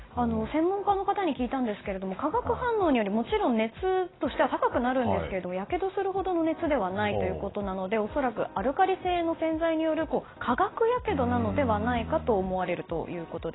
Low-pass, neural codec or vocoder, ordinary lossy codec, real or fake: 7.2 kHz; none; AAC, 16 kbps; real